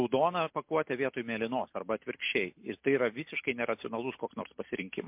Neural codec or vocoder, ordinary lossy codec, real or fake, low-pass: none; MP3, 32 kbps; real; 3.6 kHz